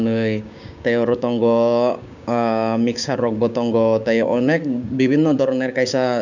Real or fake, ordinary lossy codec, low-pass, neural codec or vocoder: fake; none; 7.2 kHz; codec, 16 kHz, 6 kbps, DAC